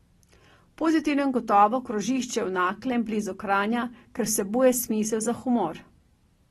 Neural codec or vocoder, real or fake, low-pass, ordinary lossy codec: none; real; 19.8 kHz; AAC, 32 kbps